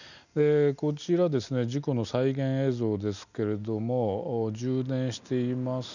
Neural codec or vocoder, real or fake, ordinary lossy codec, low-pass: none; real; none; 7.2 kHz